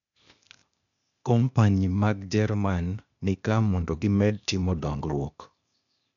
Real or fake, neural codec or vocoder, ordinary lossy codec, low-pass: fake; codec, 16 kHz, 0.8 kbps, ZipCodec; none; 7.2 kHz